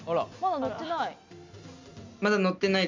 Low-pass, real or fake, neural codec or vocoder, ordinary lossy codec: 7.2 kHz; real; none; MP3, 64 kbps